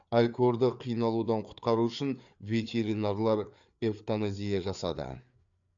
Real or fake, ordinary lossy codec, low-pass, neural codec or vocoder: fake; AAC, 64 kbps; 7.2 kHz; codec, 16 kHz, 4 kbps, FreqCodec, larger model